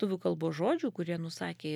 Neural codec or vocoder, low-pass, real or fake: none; 19.8 kHz; real